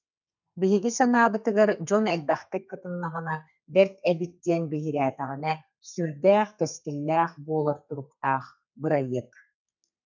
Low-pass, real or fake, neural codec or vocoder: 7.2 kHz; fake; codec, 32 kHz, 1.9 kbps, SNAC